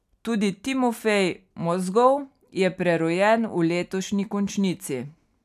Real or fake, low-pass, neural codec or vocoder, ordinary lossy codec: real; 14.4 kHz; none; none